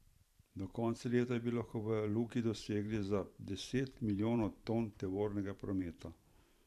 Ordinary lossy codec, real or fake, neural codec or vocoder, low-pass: AAC, 96 kbps; fake; vocoder, 44.1 kHz, 128 mel bands every 512 samples, BigVGAN v2; 14.4 kHz